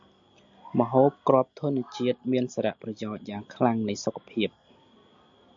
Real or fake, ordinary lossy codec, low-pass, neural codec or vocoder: real; AAC, 64 kbps; 7.2 kHz; none